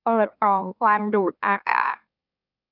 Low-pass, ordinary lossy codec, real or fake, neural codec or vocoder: 5.4 kHz; none; fake; autoencoder, 44.1 kHz, a latent of 192 numbers a frame, MeloTTS